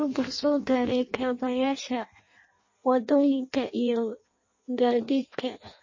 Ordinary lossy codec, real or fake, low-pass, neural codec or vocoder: MP3, 32 kbps; fake; 7.2 kHz; codec, 16 kHz in and 24 kHz out, 0.6 kbps, FireRedTTS-2 codec